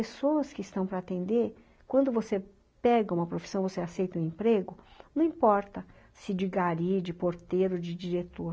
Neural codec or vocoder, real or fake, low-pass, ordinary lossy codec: none; real; none; none